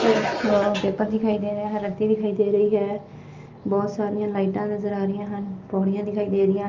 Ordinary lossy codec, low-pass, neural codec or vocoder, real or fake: Opus, 32 kbps; 7.2 kHz; none; real